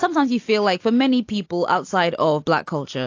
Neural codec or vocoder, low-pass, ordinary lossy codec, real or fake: none; 7.2 kHz; AAC, 48 kbps; real